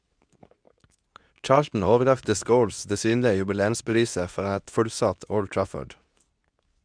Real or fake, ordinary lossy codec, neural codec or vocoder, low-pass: fake; none; codec, 24 kHz, 0.9 kbps, WavTokenizer, medium speech release version 2; 9.9 kHz